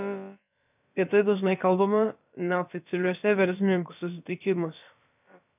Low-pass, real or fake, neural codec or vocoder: 3.6 kHz; fake; codec, 16 kHz, about 1 kbps, DyCAST, with the encoder's durations